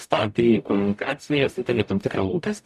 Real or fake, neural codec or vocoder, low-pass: fake; codec, 44.1 kHz, 0.9 kbps, DAC; 14.4 kHz